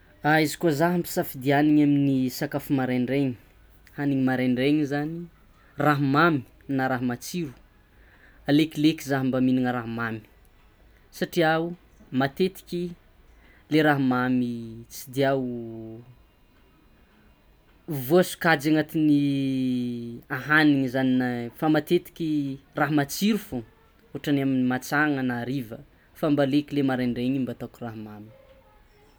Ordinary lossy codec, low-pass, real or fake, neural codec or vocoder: none; none; real; none